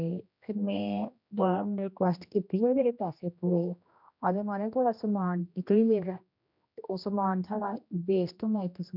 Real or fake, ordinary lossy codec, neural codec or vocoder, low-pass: fake; none; codec, 16 kHz, 1 kbps, X-Codec, HuBERT features, trained on general audio; 5.4 kHz